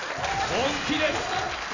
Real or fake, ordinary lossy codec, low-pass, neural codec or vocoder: real; none; 7.2 kHz; none